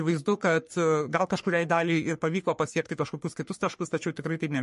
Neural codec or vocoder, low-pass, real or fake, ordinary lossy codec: codec, 44.1 kHz, 3.4 kbps, Pupu-Codec; 14.4 kHz; fake; MP3, 48 kbps